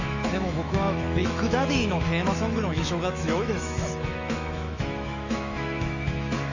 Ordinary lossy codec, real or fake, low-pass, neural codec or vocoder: none; fake; 7.2 kHz; autoencoder, 48 kHz, 128 numbers a frame, DAC-VAE, trained on Japanese speech